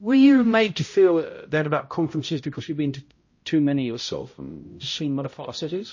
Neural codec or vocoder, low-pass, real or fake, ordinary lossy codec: codec, 16 kHz, 0.5 kbps, X-Codec, HuBERT features, trained on balanced general audio; 7.2 kHz; fake; MP3, 32 kbps